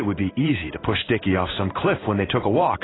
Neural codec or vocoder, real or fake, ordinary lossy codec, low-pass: codec, 16 kHz in and 24 kHz out, 1 kbps, XY-Tokenizer; fake; AAC, 16 kbps; 7.2 kHz